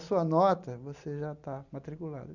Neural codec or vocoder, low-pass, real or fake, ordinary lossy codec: none; 7.2 kHz; real; none